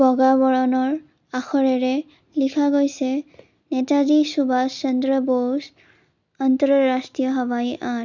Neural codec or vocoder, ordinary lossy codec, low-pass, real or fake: none; none; 7.2 kHz; real